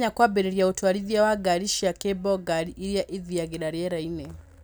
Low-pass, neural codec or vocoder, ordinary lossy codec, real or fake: none; none; none; real